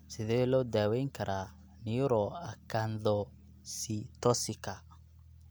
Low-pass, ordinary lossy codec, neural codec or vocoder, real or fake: none; none; none; real